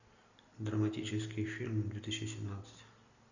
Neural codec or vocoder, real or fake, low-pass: none; real; 7.2 kHz